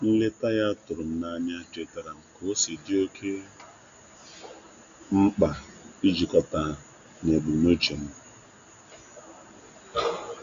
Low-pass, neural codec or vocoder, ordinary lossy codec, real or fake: 7.2 kHz; none; none; real